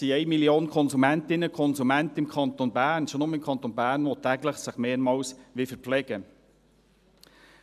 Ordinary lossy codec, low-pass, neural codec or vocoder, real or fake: none; 14.4 kHz; none; real